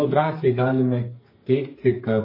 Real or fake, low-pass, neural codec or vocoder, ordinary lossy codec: fake; 5.4 kHz; codec, 44.1 kHz, 2.6 kbps, SNAC; MP3, 24 kbps